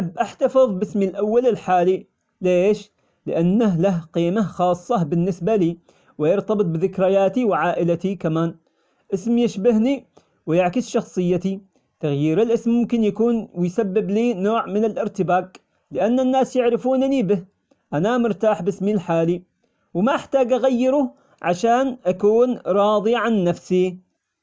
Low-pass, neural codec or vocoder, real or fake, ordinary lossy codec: none; none; real; none